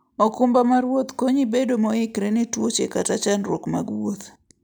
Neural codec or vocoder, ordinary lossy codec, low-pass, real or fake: none; none; none; real